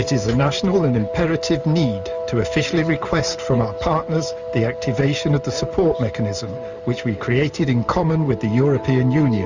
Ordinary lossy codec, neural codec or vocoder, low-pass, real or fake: Opus, 64 kbps; none; 7.2 kHz; real